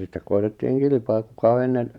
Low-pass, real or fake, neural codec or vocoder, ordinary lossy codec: 19.8 kHz; fake; vocoder, 44.1 kHz, 128 mel bands every 512 samples, BigVGAN v2; none